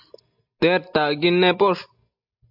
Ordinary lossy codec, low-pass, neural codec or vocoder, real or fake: AAC, 48 kbps; 5.4 kHz; none; real